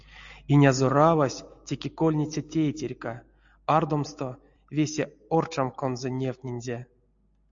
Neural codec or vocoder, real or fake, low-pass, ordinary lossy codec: none; real; 7.2 kHz; AAC, 64 kbps